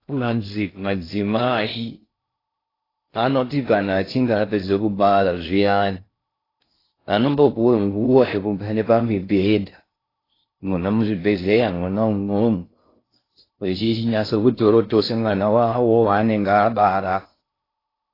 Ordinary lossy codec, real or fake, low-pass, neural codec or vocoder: AAC, 24 kbps; fake; 5.4 kHz; codec, 16 kHz in and 24 kHz out, 0.6 kbps, FocalCodec, streaming, 2048 codes